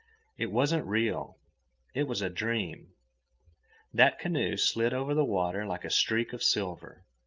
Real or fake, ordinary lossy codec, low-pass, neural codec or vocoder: real; Opus, 24 kbps; 7.2 kHz; none